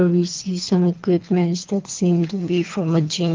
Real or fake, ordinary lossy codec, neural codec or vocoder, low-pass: fake; Opus, 32 kbps; codec, 24 kHz, 3 kbps, HILCodec; 7.2 kHz